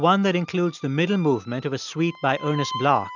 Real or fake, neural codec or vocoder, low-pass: real; none; 7.2 kHz